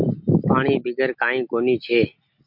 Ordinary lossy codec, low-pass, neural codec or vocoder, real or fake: MP3, 48 kbps; 5.4 kHz; none; real